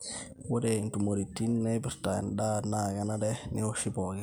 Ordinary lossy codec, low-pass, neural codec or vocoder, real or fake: none; none; none; real